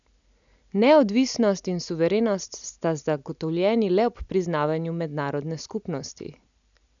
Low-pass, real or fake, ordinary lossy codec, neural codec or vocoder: 7.2 kHz; real; none; none